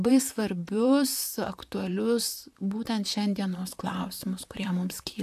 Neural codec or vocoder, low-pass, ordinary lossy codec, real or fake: vocoder, 44.1 kHz, 128 mel bands, Pupu-Vocoder; 14.4 kHz; AAC, 96 kbps; fake